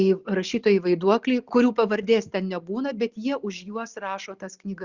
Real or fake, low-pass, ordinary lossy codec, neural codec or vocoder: real; 7.2 kHz; Opus, 64 kbps; none